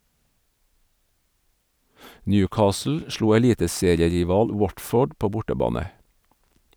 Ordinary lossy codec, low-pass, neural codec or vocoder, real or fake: none; none; none; real